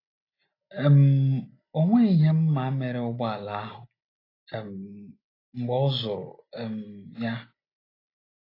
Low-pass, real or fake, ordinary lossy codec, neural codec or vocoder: 5.4 kHz; real; AAC, 24 kbps; none